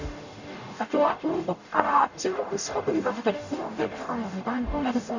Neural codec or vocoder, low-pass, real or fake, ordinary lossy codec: codec, 44.1 kHz, 0.9 kbps, DAC; 7.2 kHz; fake; none